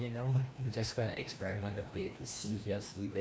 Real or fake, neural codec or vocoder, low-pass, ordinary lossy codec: fake; codec, 16 kHz, 1 kbps, FreqCodec, larger model; none; none